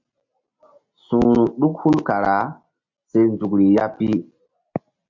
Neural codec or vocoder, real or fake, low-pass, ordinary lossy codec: none; real; 7.2 kHz; MP3, 48 kbps